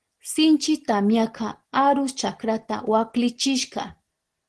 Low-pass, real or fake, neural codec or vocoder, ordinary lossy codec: 10.8 kHz; real; none; Opus, 16 kbps